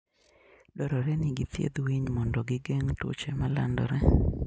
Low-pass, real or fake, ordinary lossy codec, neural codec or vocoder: none; real; none; none